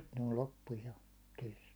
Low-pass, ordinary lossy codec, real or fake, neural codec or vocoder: none; none; real; none